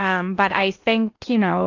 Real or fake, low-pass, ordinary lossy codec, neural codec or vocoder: fake; 7.2 kHz; AAC, 48 kbps; codec, 16 kHz in and 24 kHz out, 0.8 kbps, FocalCodec, streaming, 65536 codes